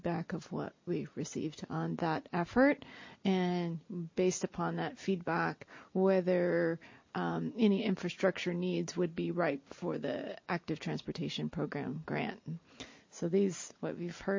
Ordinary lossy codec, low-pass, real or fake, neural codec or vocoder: MP3, 32 kbps; 7.2 kHz; real; none